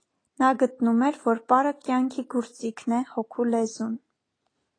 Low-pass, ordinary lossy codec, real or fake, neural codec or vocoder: 9.9 kHz; AAC, 32 kbps; real; none